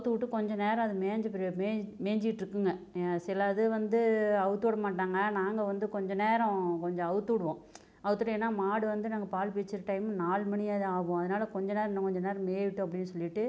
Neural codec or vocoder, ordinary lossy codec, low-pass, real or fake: none; none; none; real